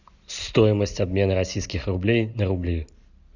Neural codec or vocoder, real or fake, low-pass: none; real; 7.2 kHz